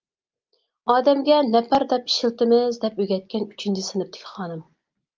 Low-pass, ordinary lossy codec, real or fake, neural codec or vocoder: 7.2 kHz; Opus, 32 kbps; real; none